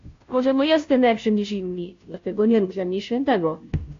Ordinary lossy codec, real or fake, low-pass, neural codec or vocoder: AAC, 48 kbps; fake; 7.2 kHz; codec, 16 kHz, 0.5 kbps, FunCodec, trained on Chinese and English, 25 frames a second